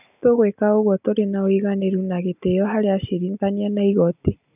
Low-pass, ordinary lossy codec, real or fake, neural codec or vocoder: 3.6 kHz; none; real; none